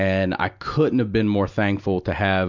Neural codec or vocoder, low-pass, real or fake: none; 7.2 kHz; real